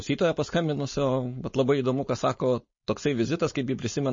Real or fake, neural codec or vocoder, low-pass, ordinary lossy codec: fake; codec, 16 kHz, 4.8 kbps, FACodec; 7.2 kHz; MP3, 32 kbps